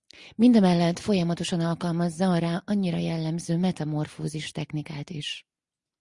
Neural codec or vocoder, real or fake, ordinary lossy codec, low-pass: none; real; Opus, 64 kbps; 10.8 kHz